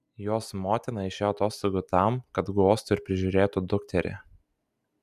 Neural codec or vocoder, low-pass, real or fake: none; 14.4 kHz; real